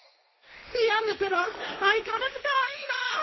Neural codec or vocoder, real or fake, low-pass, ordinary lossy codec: codec, 16 kHz, 1.1 kbps, Voila-Tokenizer; fake; 7.2 kHz; MP3, 24 kbps